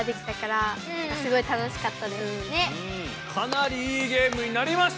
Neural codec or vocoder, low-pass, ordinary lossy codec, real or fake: none; none; none; real